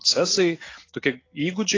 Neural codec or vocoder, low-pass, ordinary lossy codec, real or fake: none; 7.2 kHz; AAC, 32 kbps; real